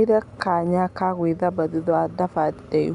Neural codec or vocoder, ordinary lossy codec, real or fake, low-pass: none; none; real; 10.8 kHz